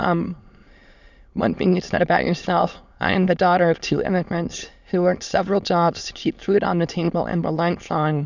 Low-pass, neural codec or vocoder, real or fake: 7.2 kHz; autoencoder, 22.05 kHz, a latent of 192 numbers a frame, VITS, trained on many speakers; fake